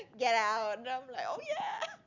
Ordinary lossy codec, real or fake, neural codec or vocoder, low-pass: none; real; none; 7.2 kHz